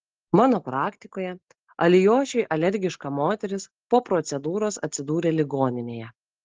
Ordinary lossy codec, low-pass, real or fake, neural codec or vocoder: Opus, 16 kbps; 7.2 kHz; real; none